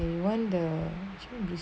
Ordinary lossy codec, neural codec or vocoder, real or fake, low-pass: none; none; real; none